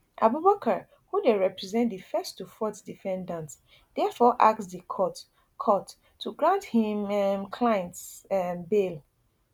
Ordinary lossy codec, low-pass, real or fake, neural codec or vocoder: none; 19.8 kHz; real; none